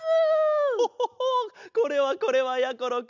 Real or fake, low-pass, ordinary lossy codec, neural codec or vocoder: real; 7.2 kHz; none; none